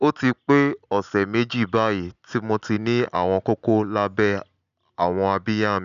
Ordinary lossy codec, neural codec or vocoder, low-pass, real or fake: none; none; 7.2 kHz; real